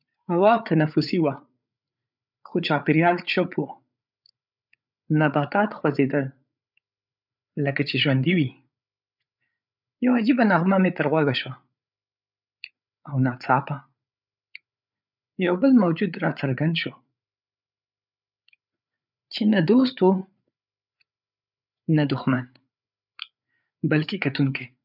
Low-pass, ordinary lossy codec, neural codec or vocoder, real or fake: 5.4 kHz; none; codec, 16 kHz, 8 kbps, FreqCodec, larger model; fake